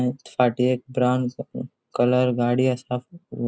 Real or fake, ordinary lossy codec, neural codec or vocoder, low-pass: real; none; none; none